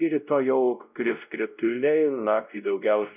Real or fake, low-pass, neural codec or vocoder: fake; 3.6 kHz; codec, 16 kHz, 0.5 kbps, X-Codec, WavLM features, trained on Multilingual LibriSpeech